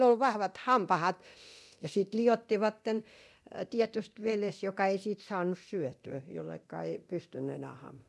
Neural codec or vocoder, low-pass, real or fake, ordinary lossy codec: codec, 24 kHz, 0.9 kbps, DualCodec; none; fake; none